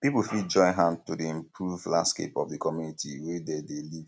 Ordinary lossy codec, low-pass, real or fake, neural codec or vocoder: none; none; real; none